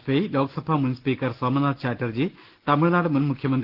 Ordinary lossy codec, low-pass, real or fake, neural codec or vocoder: Opus, 16 kbps; 5.4 kHz; real; none